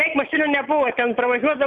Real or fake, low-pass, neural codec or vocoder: real; 9.9 kHz; none